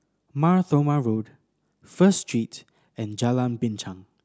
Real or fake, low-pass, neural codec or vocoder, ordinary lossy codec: real; none; none; none